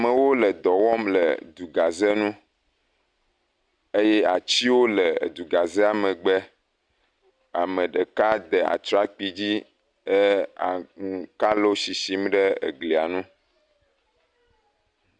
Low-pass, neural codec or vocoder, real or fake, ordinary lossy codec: 9.9 kHz; none; real; Opus, 64 kbps